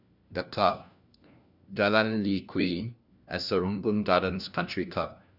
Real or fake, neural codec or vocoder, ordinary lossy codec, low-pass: fake; codec, 16 kHz, 1 kbps, FunCodec, trained on LibriTTS, 50 frames a second; none; 5.4 kHz